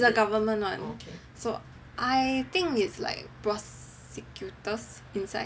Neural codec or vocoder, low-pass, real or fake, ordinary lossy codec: none; none; real; none